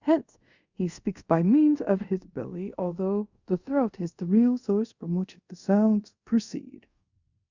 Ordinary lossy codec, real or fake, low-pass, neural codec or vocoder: Opus, 64 kbps; fake; 7.2 kHz; codec, 24 kHz, 0.5 kbps, DualCodec